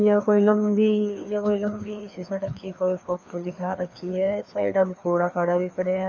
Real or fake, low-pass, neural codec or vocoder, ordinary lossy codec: fake; 7.2 kHz; codec, 16 kHz, 2 kbps, FreqCodec, larger model; none